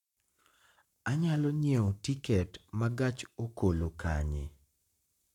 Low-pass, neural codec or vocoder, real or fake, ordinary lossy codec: 19.8 kHz; codec, 44.1 kHz, 7.8 kbps, Pupu-Codec; fake; none